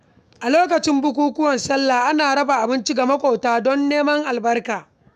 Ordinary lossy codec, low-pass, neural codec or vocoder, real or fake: none; 14.4 kHz; autoencoder, 48 kHz, 128 numbers a frame, DAC-VAE, trained on Japanese speech; fake